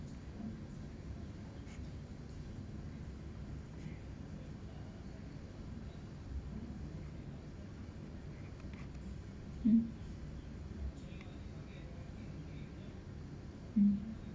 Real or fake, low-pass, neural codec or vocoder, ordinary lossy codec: real; none; none; none